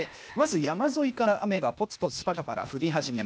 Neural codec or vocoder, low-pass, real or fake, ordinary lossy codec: codec, 16 kHz, 0.8 kbps, ZipCodec; none; fake; none